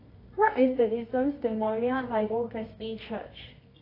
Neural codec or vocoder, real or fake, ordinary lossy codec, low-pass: codec, 24 kHz, 0.9 kbps, WavTokenizer, medium music audio release; fake; AAC, 24 kbps; 5.4 kHz